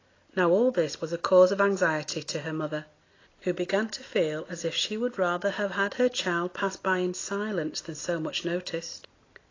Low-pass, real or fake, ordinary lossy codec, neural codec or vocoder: 7.2 kHz; real; AAC, 32 kbps; none